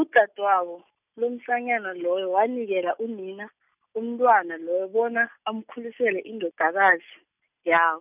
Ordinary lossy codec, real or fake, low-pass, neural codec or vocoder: none; real; 3.6 kHz; none